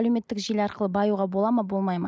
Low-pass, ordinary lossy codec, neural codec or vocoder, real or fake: none; none; none; real